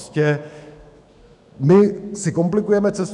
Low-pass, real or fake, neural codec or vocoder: 10.8 kHz; fake; autoencoder, 48 kHz, 128 numbers a frame, DAC-VAE, trained on Japanese speech